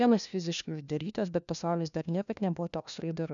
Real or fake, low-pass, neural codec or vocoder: fake; 7.2 kHz; codec, 16 kHz, 1 kbps, FunCodec, trained on LibriTTS, 50 frames a second